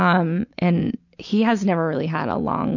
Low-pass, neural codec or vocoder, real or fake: 7.2 kHz; none; real